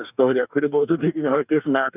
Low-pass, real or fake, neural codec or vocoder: 3.6 kHz; fake; codec, 44.1 kHz, 2.6 kbps, DAC